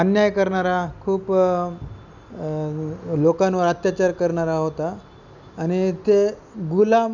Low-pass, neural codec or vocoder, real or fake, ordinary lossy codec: 7.2 kHz; none; real; none